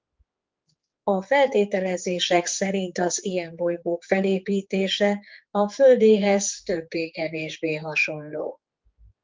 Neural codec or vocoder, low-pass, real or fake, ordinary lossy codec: codec, 16 kHz, 4 kbps, X-Codec, HuBERT features, trained on general audio; 7.2 kHz; fake; Opus, 32 kbps